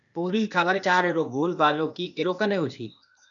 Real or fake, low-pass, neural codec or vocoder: fake; 7.2 kHz; codec, 16 kHz, 0.8 kbps, ZipCodec